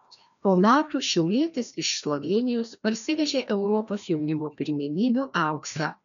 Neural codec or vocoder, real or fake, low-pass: codec, 16 kHz, 1 kbps, FreqCodec, larger model; fake; 7.2 kHz